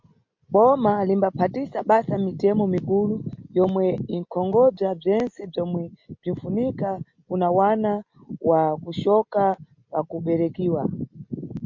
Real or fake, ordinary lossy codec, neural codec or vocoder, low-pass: real; MP3, 48 kbps; none; 7.2 kHz